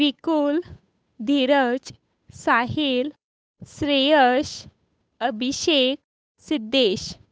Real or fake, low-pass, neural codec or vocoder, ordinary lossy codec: fake; none; codec, 16 kHz, 8 kbps, FunCodec, trained on Chinese and English, 25 frames a second; none